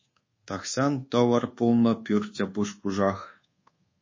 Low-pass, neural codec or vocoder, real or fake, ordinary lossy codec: 7.2 kHz; codec, 24 kHz, 1.2 kbps, DualCodec; fake; MP3, 32 kbps